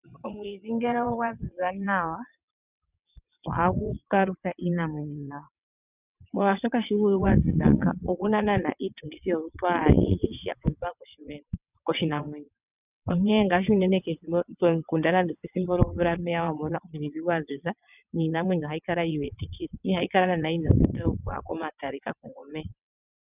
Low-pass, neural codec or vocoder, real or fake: 3.6 kHz; vocoder, 22.05 kHz, 80 mel bands, WaveNeXt; fake